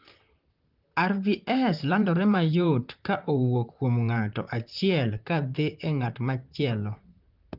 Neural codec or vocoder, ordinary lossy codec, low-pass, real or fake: vocoder, 44.1 kHz, 128 mel bands, Pupu-Vocoder; Opus, 32 kbps; 5.4 kHz; fake